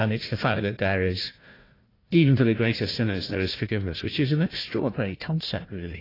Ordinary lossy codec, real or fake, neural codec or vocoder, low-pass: AAC, 24 kbps; fake; codec, 16 kHz, 1 kbps, FunCodec, trained on Chinese and English, 50 frames a second; 5.4 kHz